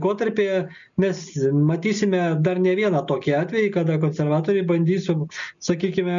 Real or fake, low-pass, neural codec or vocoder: real; 7.2 kHz; none